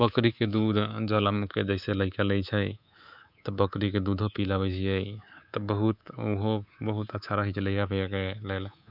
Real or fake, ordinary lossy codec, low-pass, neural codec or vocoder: fake; none; 5.4 kHz; codec, 24 kHz, 3.1 kbps, DualCodec